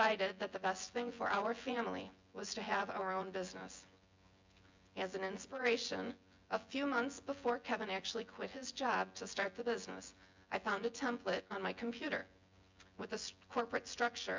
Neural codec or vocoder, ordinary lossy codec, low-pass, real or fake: vocoder, 24 kHz, 100 mel bands, Vocos; MP3, 64 kbps; 7.2 kHz; fake